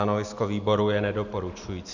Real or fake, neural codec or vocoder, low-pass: real; none; 7.2 kHz